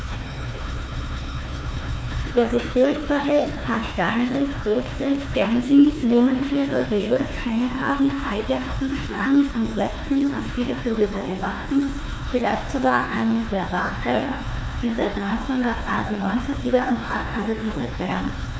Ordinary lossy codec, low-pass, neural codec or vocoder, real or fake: none; none; codec, 16 kHz, 1 kbps, FunCodec, trained on Chinese and English, 50 frames a second; fake